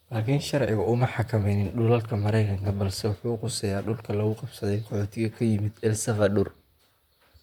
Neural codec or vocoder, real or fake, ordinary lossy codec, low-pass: vocoder, 44.1 kHz, 128 mel bands, Pupu-Vocoder; fake; MP3, 96 kbps; 19.8 kHz